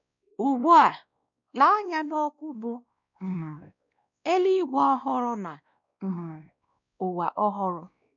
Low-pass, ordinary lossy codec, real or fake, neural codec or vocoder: 7.2 kHz; none; fake; codec, 16 kHz, 1 kbps, X-Codec, WavLM features, trained on Multilingual LibriSpeech